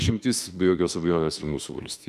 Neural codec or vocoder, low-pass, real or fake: autoencoder, 48 kHz, 32 numbers a frame, DAC-VAE, trained on Japanese speech; 14.4 kHz; fake